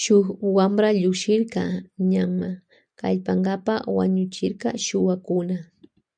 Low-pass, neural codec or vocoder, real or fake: 9.9 kHz; none; real